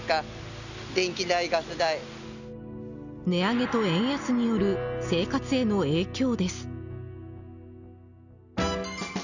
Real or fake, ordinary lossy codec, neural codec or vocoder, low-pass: real; none; none; 7.2 kHz